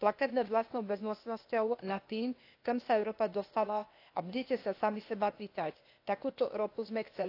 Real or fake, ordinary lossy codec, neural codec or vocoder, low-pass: fake; AAC, 32 kbps; codec, 16 kHz, 0.8 kbps, ZipCodec; 5.4 kHz